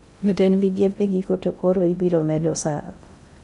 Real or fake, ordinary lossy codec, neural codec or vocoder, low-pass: fake; none; codec, 16 kHz in and 24 kHz out, 0.6 kbps, FocalCodec, streaming, 2048 codes; 10.8 kHz